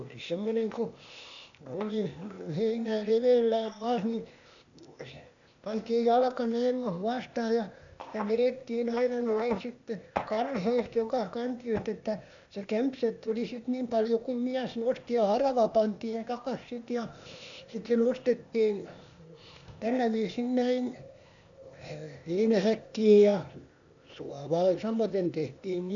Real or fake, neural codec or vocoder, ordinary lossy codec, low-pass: fake; codec, 16 kHz, 0.8 kbps, ZipCodec; none; 7.2 kHz